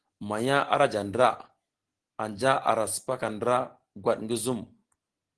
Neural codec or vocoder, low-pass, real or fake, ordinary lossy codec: none; 10.8 kHz; real; Opus, 16 kbps